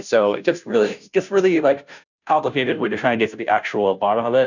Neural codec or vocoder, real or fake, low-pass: codec, 16 kHz, 0.5 kbps, FunCodec, trained on Chinese and English, 25 frames a second; fake; 7.2 kHz